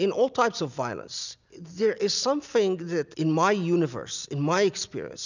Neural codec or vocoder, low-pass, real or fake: none; 7.2 kHz; real